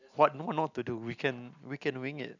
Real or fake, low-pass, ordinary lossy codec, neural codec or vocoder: real; 7.2 kHz; none; none